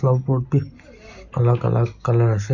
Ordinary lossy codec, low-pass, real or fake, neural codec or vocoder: none; 7.2 kHz; real; none